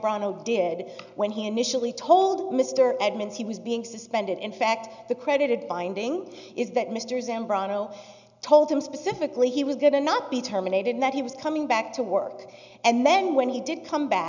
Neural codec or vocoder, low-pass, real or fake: none; 7.2 kHz; real